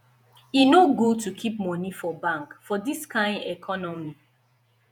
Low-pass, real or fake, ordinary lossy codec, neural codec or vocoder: 19.8 kHz; fake; none; vocoder, 48 kHz, 128 mel bands, Vocos